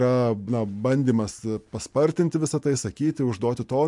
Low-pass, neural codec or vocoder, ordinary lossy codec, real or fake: 10.8 kHz; none; MP3, 64 kbps; real